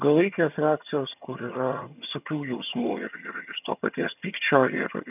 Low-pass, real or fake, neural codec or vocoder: 3.6 kHz; fake; vocoder, 22.05 kHz, 80 mel bands, HiFi-GAN